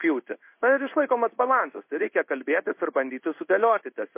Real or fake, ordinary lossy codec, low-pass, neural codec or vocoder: fake; MP3, 24 kbps; 3.6 kHz; codec, 16 kHz in and 24 kHz out, 1 kbps, XY-Tokenizer